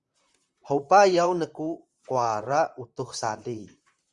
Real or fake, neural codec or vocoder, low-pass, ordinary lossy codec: fake; vocoder, 44.1 kHz, 128 mel bands, Pupu-Vocoder; 10.8 kHz; Opus, 64 kbps